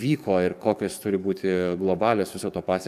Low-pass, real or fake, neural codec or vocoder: 14.4 kHz; fake; codec, 44.1 kHz, 7.8 kbps, DAC